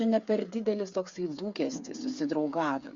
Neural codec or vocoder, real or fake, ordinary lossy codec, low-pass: codec, 16 kHz, 8 kbps, FreqCodec, smaller model; fake; Opus, 64 kbps; 7.2 kHz